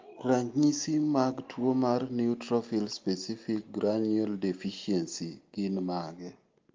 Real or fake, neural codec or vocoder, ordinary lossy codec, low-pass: real; none; Opus, 32 kbps; 7.2 kHz